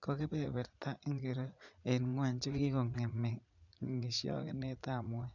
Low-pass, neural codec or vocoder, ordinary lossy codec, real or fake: 7.2 kHz; vocoder, 44.1 kHz, 80 mel bands, Vocos; none; fake